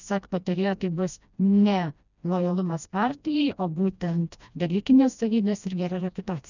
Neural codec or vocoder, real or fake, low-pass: codec, 16 kHz, 1 kbps, FreqCodec, smaller model; fake; 7.2 kHz